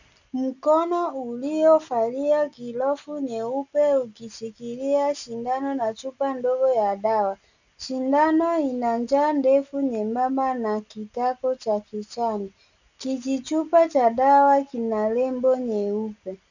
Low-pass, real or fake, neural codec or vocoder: 7.2 kHz; real; none